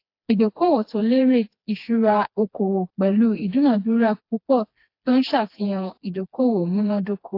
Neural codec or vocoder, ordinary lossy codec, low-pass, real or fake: codec, 16 kHz, 2 kbps, FreqCodec, smaller model; AAC, 32 kbps; 5.4 kHz; fake